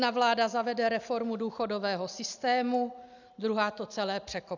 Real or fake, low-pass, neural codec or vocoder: real; 7.2 kHz; none